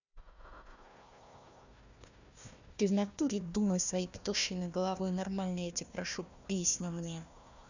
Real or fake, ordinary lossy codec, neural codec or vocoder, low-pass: fake; none; codec, 16 kHz, 1 kbps, FunCodec, trained on Chinese and English, 50 frames a second; 7.2 kHz